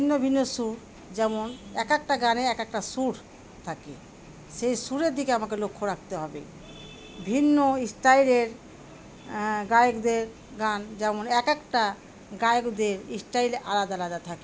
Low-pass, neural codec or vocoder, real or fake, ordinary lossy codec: none; none; real; none